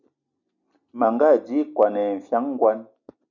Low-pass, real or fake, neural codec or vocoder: 7.2 kHz; real; none